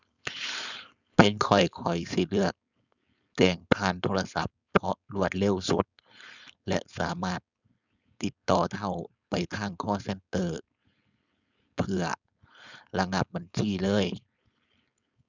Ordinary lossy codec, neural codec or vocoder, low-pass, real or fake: none; codec, 16 kHz, 4.8 kbps, FACodec; 7.2 kHz; fake